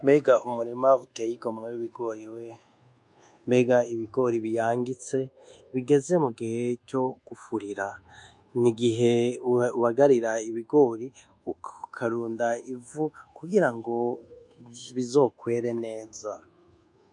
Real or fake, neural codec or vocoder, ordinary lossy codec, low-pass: fake; codec, 24 kHz, 1.2 kbps, DualCodec; MP3, 64 kbps; 10.8 kHz